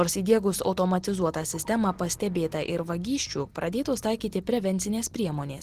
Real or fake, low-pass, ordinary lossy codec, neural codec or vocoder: real; 14.4 kHz; Opus, 16 kbps; none